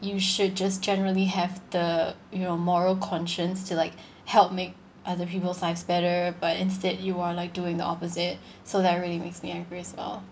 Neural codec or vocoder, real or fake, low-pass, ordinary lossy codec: none; real; none; none